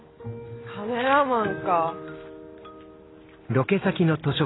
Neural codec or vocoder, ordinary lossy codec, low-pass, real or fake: none; AAC, 16 kbps; 7.2 kHz; real